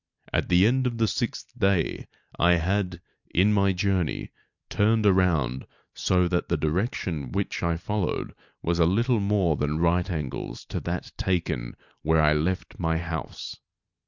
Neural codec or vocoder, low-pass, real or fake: none; 7.2 kHz; real